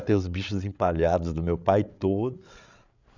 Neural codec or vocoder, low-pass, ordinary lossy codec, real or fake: codec, 16 kHz, 8 kbps, FreqCodec, larger model; 7.2 kHz; none; fake